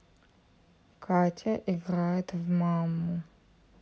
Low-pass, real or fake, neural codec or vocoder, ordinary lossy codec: none; real; none; none